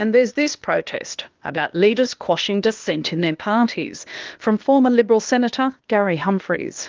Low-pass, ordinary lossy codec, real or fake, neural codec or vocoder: 7.2 kHz; Opus, 32 kbps; fake; codec, 16 kHz, 0.8 kbps, ZipCodec